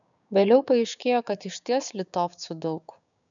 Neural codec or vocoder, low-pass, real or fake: codec, 16 kHz, 6 kbps, DAC; 7.2 kHz; fake